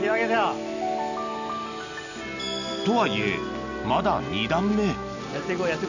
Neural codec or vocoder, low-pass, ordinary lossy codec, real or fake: none; 7.2 kHz; none; real